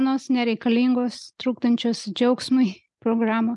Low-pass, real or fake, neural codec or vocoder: 10.8 kHz; real; none